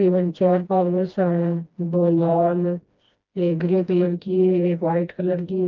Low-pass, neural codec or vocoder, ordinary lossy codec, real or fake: 7.2 kHz; codec, 16 kHz, 1 kbps, FreqCodec, smaller model; Opus, 32 kbps; fake